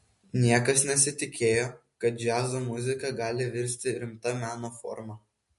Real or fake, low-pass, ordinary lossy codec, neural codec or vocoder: real; 14.4 kHz; MP3, 48 kbps; none